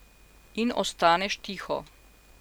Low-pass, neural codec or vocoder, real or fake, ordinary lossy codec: none; none; real; none